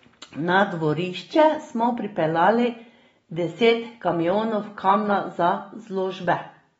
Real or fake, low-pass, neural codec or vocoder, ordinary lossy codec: real; 9.9 kHz; none; AAC, 24 kbps